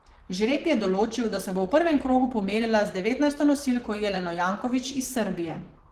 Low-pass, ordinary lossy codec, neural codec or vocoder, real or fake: 14.4 kHz; Opus, 16 kbps; vocoder, 44.1 kHz, 128 mel bands, Pupu-Vocoder; fake